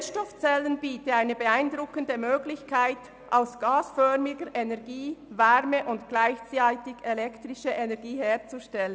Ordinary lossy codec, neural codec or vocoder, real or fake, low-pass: none; none; real; none